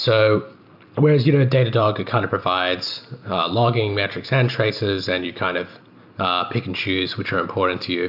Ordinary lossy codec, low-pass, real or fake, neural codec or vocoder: AAC, 48 kbps; 5.4 kHz; real; none